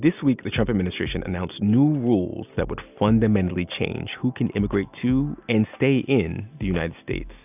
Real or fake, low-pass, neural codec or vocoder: real; 3.6 kHz; none